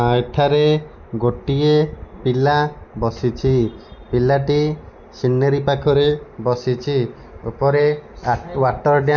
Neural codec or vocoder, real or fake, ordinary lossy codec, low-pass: none; real; none; 7.2 kHz